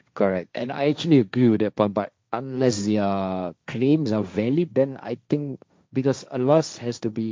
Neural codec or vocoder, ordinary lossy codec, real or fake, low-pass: codec, 16 kHz, 1.1 kbps, Voila-Tokenizer; none; fake; none